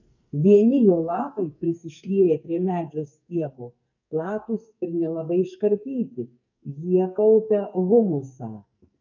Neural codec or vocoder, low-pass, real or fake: codec, 44.1 kHz, 2.6 kbps, SNAC; 7.2 kHz; fake